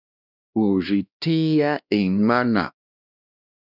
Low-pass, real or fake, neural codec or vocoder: 5.4 kHz; fake; codec, 16 kHz, 1 kbps, X-Codec, WavLM features, trained on Multilingual LibriSpeech